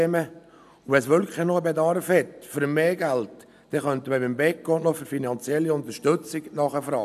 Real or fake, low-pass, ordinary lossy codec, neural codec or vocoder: real; 14.4 kHz; none; none